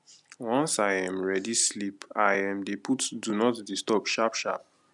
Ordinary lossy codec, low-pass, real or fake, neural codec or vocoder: none; 10.8 kHz; real; none